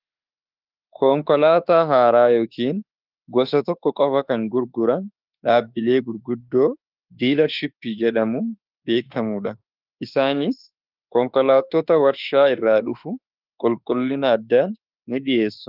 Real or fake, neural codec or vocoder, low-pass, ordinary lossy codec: fake; autoencoder, 48 kHz, 32 numbers a frame, DAC-VAE, trained on Japanese speech; 5.4 kHz; Opus, 24 kbps